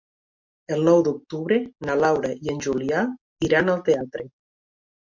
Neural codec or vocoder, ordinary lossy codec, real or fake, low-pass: none; MP3, 48 kbps; real; 7.2 kHz